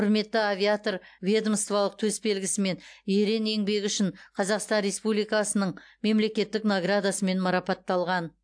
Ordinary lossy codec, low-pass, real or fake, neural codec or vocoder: AAC, 64 kbps; 9.9 kHz; real; none